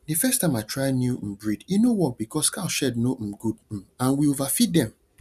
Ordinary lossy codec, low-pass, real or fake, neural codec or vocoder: none; 14.4 kHz; real; none